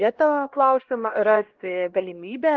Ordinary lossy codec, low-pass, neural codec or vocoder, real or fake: Opus, 16 kbps; 7.2 kHz; codec, 16 kHz, 1 kbps, X-Codec, HuBERT features, trained on LibriSpeech; fake